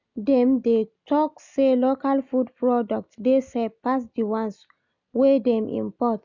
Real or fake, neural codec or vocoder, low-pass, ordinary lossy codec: real; none; 7.2 kHz; none